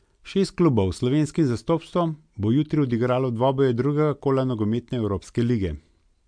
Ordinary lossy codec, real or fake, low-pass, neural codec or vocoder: MP3, 64 kbps; real; 9.9 kHz; none